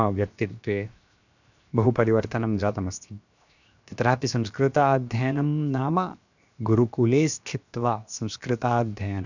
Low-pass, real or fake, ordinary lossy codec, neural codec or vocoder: 7.2 kHz; fake; none; codec, 16 kHz, 0.7 kbps, FocalCodec